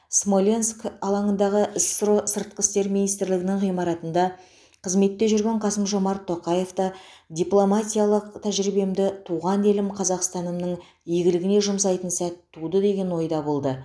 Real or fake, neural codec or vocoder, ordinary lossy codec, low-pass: real; none; none; none